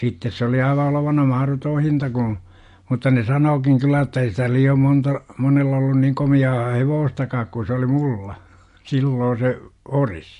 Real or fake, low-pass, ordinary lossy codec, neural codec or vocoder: real; 10.8 kHz; MP3, 48 kbps; none